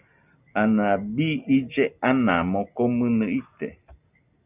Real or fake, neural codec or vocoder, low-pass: real; none; 3.6 kHz